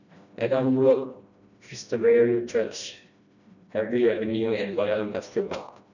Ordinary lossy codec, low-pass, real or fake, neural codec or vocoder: none; 7.2 kHz; fake; codec, 16 kHz, 1 kbps, FreqCodec, smaller model